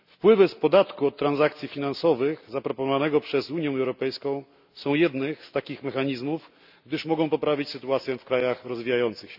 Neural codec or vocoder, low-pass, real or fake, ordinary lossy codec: none; 5.4 kHz; real; none